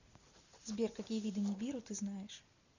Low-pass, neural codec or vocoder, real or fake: 7.2 kHz; none; real